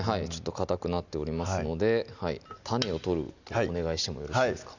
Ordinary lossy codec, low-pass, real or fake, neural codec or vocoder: none; 7.2 kHz; real; none